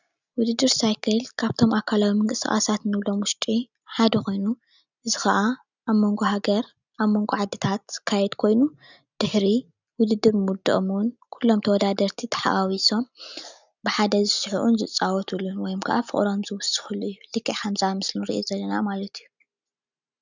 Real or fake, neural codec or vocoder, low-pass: real; none; 7.2 kHz